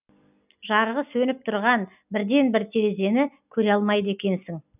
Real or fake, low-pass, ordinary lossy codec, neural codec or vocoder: real; 3.6 kHz; none; none